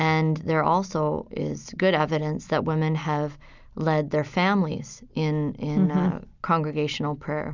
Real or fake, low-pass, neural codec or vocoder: real; 7.2 kHz; none